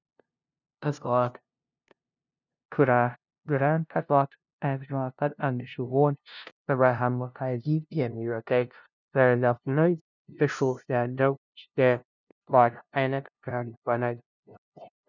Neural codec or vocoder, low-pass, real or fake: codec, 16 kHz, 0.5 kbps, FunCodec, trained on LibriTTS, 25 frames a second; 7.2 kHz; fake